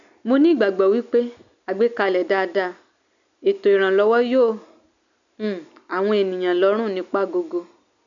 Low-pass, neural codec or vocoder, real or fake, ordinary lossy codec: 7.2 kHz; none; real; none